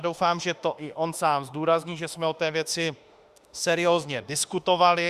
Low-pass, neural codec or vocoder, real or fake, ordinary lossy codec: 14.4 kHz; autoencoder, 48 kHz, 32 numbers a frame, DAC-VAE, trained on Japanese speech; fake; Opus, 64 kbps